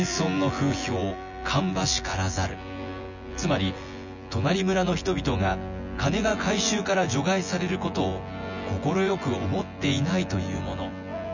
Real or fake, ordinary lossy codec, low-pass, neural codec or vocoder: fake; none; 7.2 kHz; vocoder, 24 kHz, 100 mel bands, Vocos